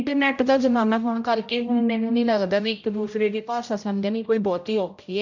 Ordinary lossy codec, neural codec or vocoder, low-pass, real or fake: none; codec, 16 kHz, 0.5 kbps, X-Codec, HuBERT features, trained on general audio; 7.2 kHz; fake